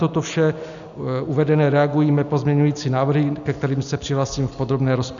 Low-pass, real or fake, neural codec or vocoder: 7.2 kHz; real; none